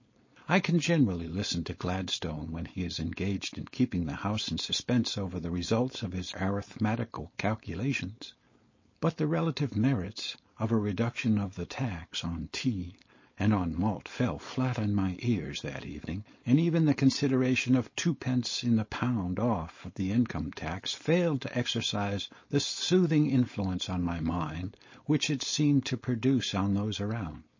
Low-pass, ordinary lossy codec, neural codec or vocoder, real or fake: 7.2 kHz; MP3, 32 kbps; codec, 16 kHz, 4.8 kbps, FACodec; fake